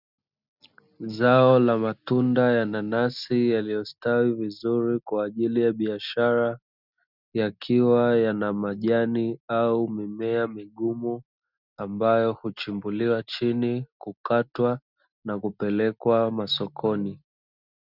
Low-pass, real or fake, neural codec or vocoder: 5.4 kHz; real; none